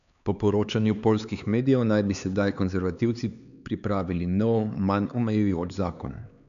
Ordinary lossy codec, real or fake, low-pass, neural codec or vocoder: none; fake; 7.2 kHz; codec, 16 kHz, 4 kbps, X-Codec, HuBERT features, trained on LibriSpeech